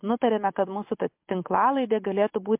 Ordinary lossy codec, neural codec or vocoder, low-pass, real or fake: MP3, 32 kbps; none; 3.6 kHz; real